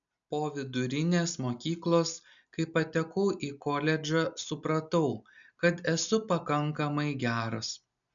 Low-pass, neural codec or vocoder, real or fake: 7.2 kHz; none; real